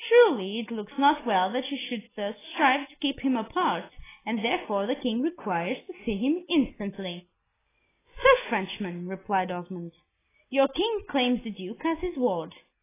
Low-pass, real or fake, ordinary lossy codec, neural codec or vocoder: 3.6 kHz; real; AAC, 16 kbps; none